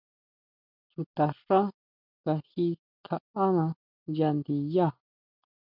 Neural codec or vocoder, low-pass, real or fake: none; 5.4 kHz; real